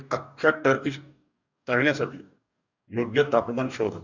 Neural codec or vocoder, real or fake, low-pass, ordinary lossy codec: codec, 44.1 kHz, 2.6 kbps, DAC; fake; 7.2 kHz; none